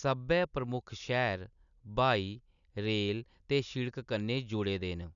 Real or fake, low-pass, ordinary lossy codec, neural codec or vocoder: real; 7.2 kHz; none; none